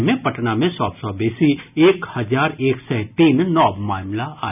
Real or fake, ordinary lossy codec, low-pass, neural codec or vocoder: real; none; 3.6 kHz; none